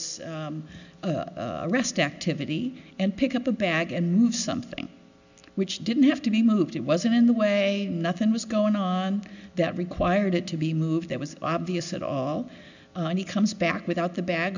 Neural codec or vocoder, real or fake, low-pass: none; real; 7.2 kHz